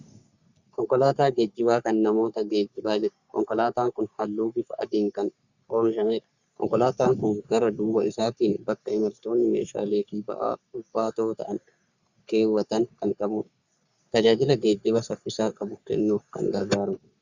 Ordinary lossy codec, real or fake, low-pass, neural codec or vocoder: Opus, 64 kbps; fake; 7.2 kHz; codec, 44.1 kHz, 3.4 kbps, Pupu-Codec